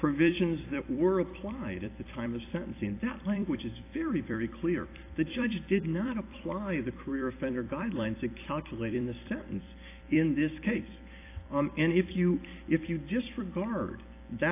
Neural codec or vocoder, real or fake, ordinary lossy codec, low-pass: vocoder, 44.1 kHz, 128 mel bands every 256 samples, BigVGAN v2; fake; AAC, 24 kbps; 3.6 kHz